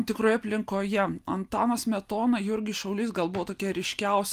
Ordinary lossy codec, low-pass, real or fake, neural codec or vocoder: Opus, 32 kbps; 14.4 kHz; real; none